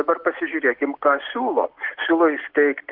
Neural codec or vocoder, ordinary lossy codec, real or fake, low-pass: none; Opus, 16 kbps; real; 5.4 kHz